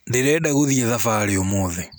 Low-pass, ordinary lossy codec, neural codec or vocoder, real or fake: none; none; none; real